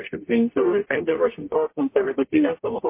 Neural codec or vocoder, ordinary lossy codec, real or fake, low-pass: codec, 44.1 kHz, 0.9 kbps, DAC; MP3, 24 kbps; fake; 3.6 kHz